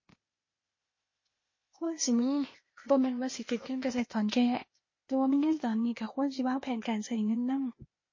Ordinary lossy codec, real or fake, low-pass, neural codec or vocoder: MP3, 32 kbps; fake; 7.2 kHz; codec, 16 kHz, 0.8 kbps, ZipCodec